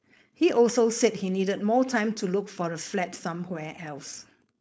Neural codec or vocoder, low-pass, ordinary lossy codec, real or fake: codec, 16 kHz, 4.8 kbps, FACodec; none; none; fake